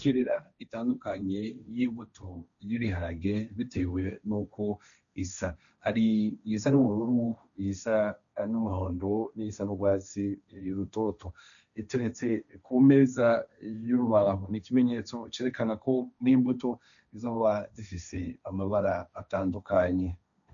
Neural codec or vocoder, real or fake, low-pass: codec, 16 kHz, 1.1 kbps, Voila-Tokenizer; fake; 7.2 kHz